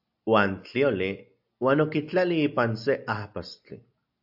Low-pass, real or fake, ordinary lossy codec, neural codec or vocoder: 5.4 kHz; real; AAC, 48 kbps; none